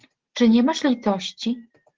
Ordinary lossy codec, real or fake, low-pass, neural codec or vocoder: Opus, 16 kbps; real; 7.2 kHz; none